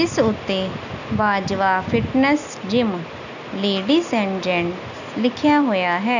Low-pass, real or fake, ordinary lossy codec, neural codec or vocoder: 7.2 kHz; real; none; none